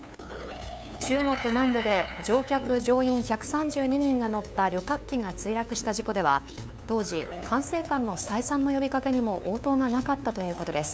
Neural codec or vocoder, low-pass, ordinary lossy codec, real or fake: codec, 16 kHz, 2 kbps, FunCodec, trained on LibriTTS, 25 frames a second; none; none; fake